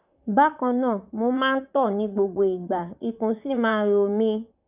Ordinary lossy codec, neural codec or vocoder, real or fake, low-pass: none; vocoder, 44.1 kHz, 128 mel bands, Pupu-Vocoder; fake; 3.6 kHz